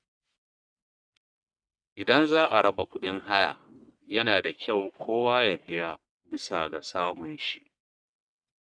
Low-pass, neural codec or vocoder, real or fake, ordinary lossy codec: 9.9 kHz; codec, 44.1 kHz, 1.7 kbps, Pupu-Codec; fake; none